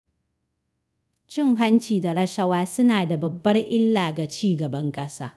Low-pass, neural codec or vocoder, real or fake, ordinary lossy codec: none; codec, 24 kHz, 0.5 kbps, DualCodec; fake; none